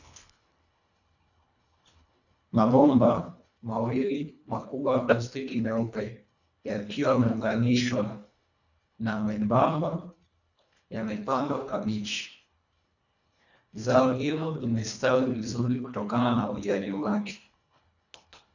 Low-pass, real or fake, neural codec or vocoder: 7.2 kHz; fake; codec, 24 kHz, 1.5 kbps, HILCodec